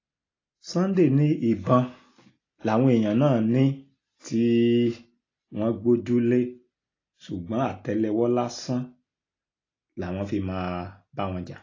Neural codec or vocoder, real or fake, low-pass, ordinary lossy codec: none; real; 7.2 kHz; AAC, 32 kbps